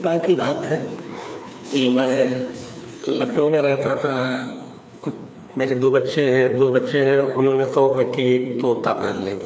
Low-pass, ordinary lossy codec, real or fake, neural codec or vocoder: none; none; fake; codec, 16 kHz, 2 kbps, FreqCodec, larger model